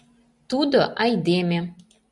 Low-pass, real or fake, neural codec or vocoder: 10.8 kHz; real; none